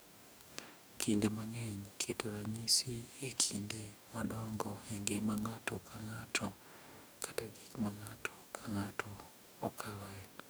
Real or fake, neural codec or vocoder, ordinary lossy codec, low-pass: fake; codec, 44.1 kHz, 2.6 kbps, DAC; none; none